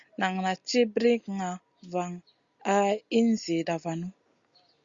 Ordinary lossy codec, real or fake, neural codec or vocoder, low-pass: Opus, 64 kbps; real; none; 7.2 kHz